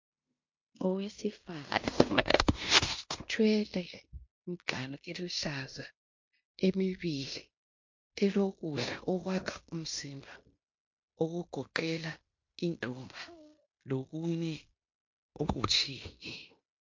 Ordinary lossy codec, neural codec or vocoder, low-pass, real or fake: MP3, 48 kbps; codec, 16 kHz in and 24 kHz out, 0.9 kbps, LongCat-Audio-Codec, fine tuned four codebook decoder; 7.2 kHz; fake